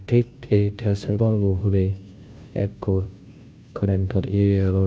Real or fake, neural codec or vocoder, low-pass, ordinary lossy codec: fake; codec, 16 kHz, 0.5 kbps, FunCodec, trained on Chinese and English, 25 frames a second; none; none